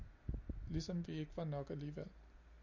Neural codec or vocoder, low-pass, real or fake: none; 7.2 kHz; real